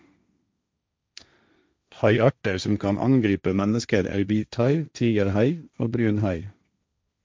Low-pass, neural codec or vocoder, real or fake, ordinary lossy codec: none; codec, 16 kHz, 1.1 kbps, Voila-Tokenizer; fake; none